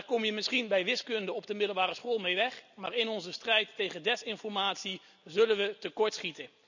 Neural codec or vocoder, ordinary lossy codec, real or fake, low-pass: none; none; real; 7.2 kHz